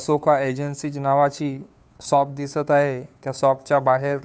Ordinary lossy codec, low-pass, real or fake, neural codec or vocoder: none; none; fake; codec, 16 kHz, 2 kbps, FunCodec, trained on Chinese and English, 25 frames a second